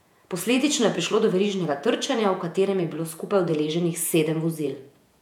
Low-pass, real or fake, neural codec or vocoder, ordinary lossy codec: 19.8 kHz; fake; vocoder, 48 kHz, 128 mel bands, Vocos; none